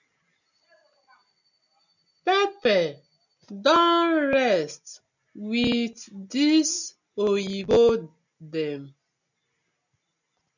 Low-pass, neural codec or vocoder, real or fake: 7.2 kHz; none; real